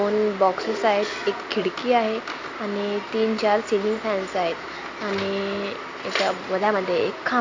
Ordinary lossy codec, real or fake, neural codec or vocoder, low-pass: MP3, 48 kbps; real; none; 7.2 kHz